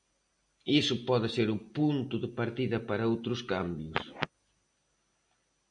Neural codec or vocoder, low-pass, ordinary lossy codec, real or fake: none; 9.9 kHz; AAC, 64 kbps; real